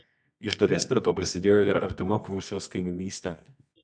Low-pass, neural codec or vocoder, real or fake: 9.9 kHz; codec, 24 kHz, 0.9 kbps, WavTokenizer, medium music audio release; fake